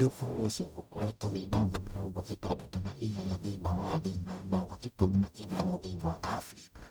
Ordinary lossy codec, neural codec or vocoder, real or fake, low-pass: none; codec, 44.1 kHz, 0.9 kbps, DAC; fake; none